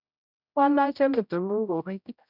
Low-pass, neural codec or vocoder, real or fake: 5.4 kHz; codec, 16 kHz, 0.5 kbps, X-Codec, HuBERT features, trained on general audio; fake